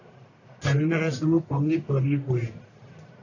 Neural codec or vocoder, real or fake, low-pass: codec, 44.1 kHz, 1.7 kbps, Pupu-Codec; fake; 7.2 kHz